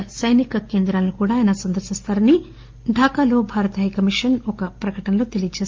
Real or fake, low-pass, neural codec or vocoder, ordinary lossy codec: real; 7.2 kHz; none; Opus, 32 kbps